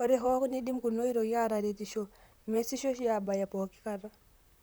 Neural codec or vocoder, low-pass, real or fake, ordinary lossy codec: vocoder, 44.1 kHz, 128 mel bands, Pupu-Vocoder; none; fake; none